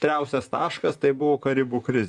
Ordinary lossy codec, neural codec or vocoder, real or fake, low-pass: Opus, 64 kbps; vocoder, 44.1 kHz, 128 mel bands, Pupu-Vocoder; fake; 10.8 kHz